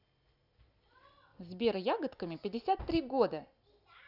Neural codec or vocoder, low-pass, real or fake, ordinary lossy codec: none; 5.4 kHz; real; none